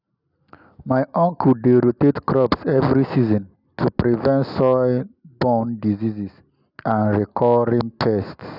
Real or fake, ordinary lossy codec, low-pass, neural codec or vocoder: real; none; 5.4 kHz; none